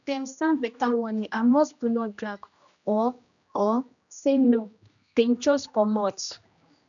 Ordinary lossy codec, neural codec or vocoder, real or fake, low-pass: none; codec, 16 kHz, 1 kbps, X-Codec, HuBERT features, trained on general audio; fake; 7.2 kHz